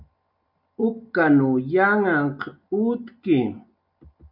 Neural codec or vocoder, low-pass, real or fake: none; 5.4 kHz; real